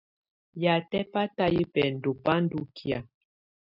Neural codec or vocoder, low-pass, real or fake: none; 5.4 kHz; real